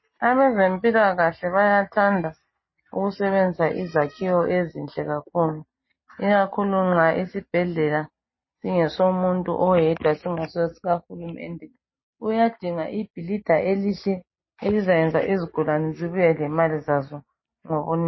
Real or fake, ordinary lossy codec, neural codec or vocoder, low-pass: real; MP3, 24 kbps; none; 7.2 kHz